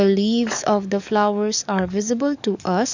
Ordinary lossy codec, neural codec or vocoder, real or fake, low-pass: none; codec, 44.1 kHz, 7.8 kbps, DAC; fake; 7.2 kHz